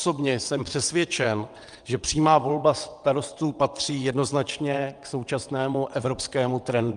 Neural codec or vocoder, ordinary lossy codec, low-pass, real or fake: vocoder, 22.05 kHz, 80 mel bands, WaveNeXt; Opus, 32 kbps; 9.9 kHz; fake